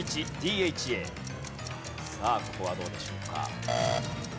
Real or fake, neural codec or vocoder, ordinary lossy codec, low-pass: real; none; none; none